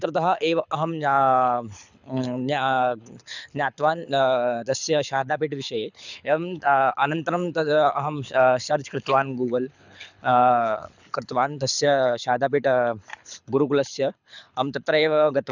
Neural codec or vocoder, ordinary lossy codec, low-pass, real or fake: codec, 24 kHz, 6 kbps, HILCodec; none; 7.2 kHz; fake